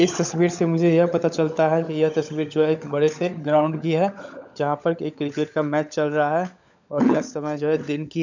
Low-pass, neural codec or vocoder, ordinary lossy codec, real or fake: 7.2 kHz; codec, 16 kHz, 16 kbps, FunCodec, trained on LibriTTS, 50 frames a second; none; fake